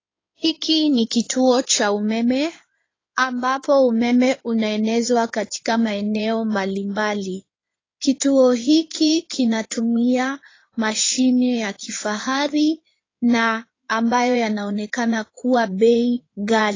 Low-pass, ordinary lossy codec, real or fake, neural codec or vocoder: 7.2 kHz; AAC, 32 kbps; fake; codec, 16 kHz in and 24 kHz out, 2.2 kbps, FireRedTTS-2 codec